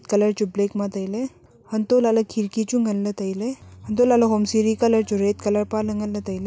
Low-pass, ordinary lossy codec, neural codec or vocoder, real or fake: none; none; none; real